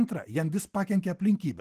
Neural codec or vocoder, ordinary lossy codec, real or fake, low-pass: vocoder, 44.1 kHz, 128 mel bands every 512 samples, BigVGAN v2; Opus, 16 kbps; fake; 14.4 kHz